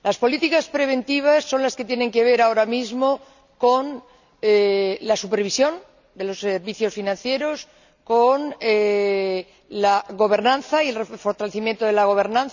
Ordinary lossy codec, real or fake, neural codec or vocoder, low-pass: none; real; none; 7.2 kHz